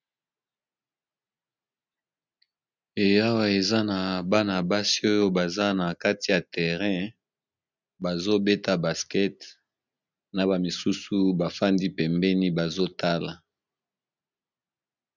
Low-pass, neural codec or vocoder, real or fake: 7.2 kHz; none; real